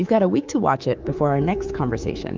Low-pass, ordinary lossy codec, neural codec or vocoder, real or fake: 7.2 kHz; Opus, 24 kbps; codec, 24 kHz, 3.1 kbps, DualCodec; fake